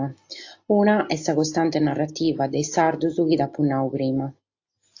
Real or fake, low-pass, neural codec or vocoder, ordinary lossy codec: real; 7.2 kHz; none; AAC, 48 kbps